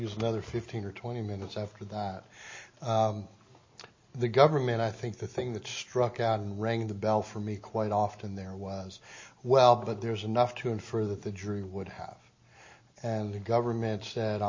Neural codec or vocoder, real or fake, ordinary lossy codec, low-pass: none; real; MP3, 32 kbps; 7.2 kHz